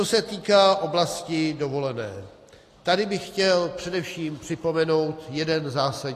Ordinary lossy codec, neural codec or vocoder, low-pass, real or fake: AAC, 48 kbps; none; 14.4 kHz; real